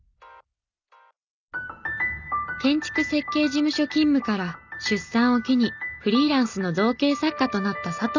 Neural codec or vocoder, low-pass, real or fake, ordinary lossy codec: none; 7.2 kHz; real; none